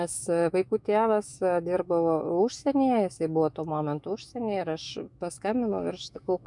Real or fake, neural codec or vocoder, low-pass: fake; autoencoder, 48 kHz, 128 numbers a frame, DAC-VAE, trained on Japanese speech; 10.8 kHz